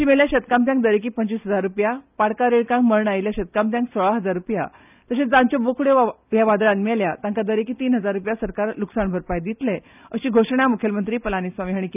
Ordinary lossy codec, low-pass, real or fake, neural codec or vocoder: none; 3.6 kHz; real; none